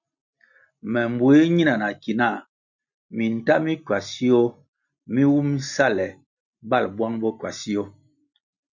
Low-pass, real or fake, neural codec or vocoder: 7.2 kHz; real; none